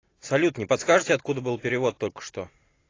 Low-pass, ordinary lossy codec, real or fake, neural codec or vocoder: 7.2 kHz; AAC, 32 kbps; real; none